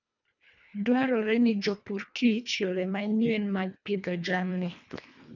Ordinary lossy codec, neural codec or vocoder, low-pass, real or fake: none; codec, 24 kHz, 1.5 kbps, HILCodec; 7.2 kHz; fake